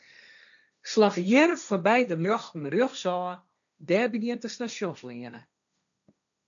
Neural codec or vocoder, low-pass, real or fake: codec, 16 kHz, 1.1 kbps, Voila-Tokenizer; 7.2 kHz; fake